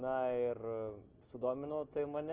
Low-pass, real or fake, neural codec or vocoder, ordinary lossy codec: 3.6 kHz; real; none; Opus, 16 kbps